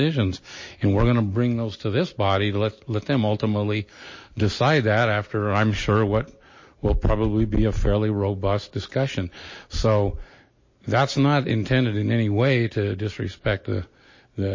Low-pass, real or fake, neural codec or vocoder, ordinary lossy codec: 7.2 kHz; real; none; MP3, 32 kbps